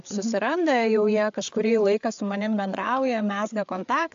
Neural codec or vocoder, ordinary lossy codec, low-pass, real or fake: codec, 16 kHz, 4 kbps, FreqCodec, larger model; AAC, 64 kbps; 7.2 kHz; fake